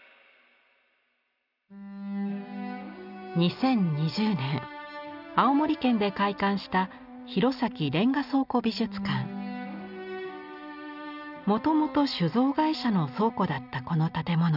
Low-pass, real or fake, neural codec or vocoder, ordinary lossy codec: 5.4 kHz; real; none; none